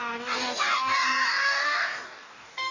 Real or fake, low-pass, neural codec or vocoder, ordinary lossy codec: fake; 7.2 kHz; codec, 44.1 kHz, 2.6 kbps, DAC; none